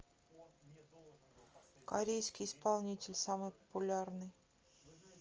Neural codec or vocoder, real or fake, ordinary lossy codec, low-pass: none; real; Opus, 24 kbps; 7.2 kHz